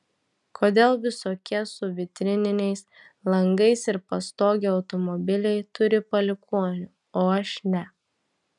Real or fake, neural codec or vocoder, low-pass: real; none; 10.8 kHz